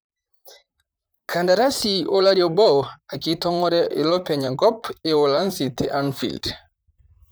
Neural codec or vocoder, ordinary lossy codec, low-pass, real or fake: vocoder, 44.1 kHz, 128 mel bands, Pupu-Vocoder; none; none; fake